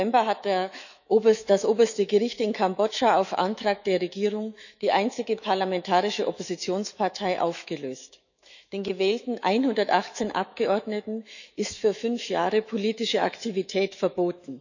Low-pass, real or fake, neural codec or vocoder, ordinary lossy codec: 7.2 kHz; fake; autoencoder, 48 kHz, 128 numbers a frame, DAC-VAE, trained on Japanese speech; none